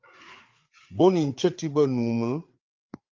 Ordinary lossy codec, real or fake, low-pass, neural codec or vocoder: Opus, 24 kbps; fake; 7.2 kHz; codec, 16 kHz, 4 kbps, FreqCodec, larger model